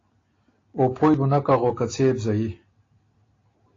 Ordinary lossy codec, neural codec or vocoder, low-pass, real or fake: AAC, 32 kbps; none; 7.2 kHz; real